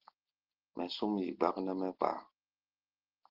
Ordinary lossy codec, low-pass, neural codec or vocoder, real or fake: Opus, 16 kbps; 5.4 kHz; none; real